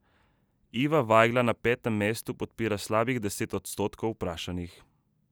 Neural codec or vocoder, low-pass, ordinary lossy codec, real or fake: none; none; none; real